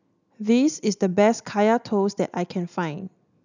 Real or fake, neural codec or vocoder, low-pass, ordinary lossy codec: real; none; 7.2 kHz; none